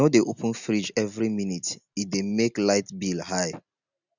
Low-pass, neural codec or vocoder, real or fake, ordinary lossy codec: 7.2 kHz; none; real; none